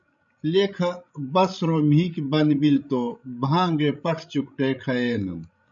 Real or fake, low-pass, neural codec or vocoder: fake; 7.2 kHz; codec, 16 kHz, 16 kbps, FreqCodec, larger model